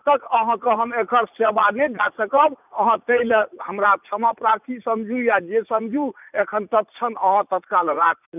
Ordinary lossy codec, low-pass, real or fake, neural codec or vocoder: none; 3.6 kHz; fake; vocoder, 44.1 kHz, 80 mel bands, Vocos